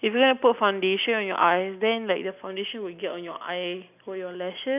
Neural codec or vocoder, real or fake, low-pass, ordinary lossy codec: none; real; 3.6 kHz; none